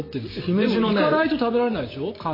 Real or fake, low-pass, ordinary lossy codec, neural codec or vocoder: fake; 5.4 kHz; MP3, 24 kbps; vocoder, 44.1 kHz, 128 mel bands every 512 samples, BigVGAN v2